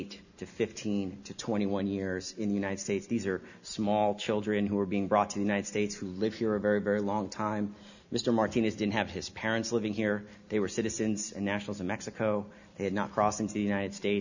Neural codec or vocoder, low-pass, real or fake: none; 7.2 kHz; real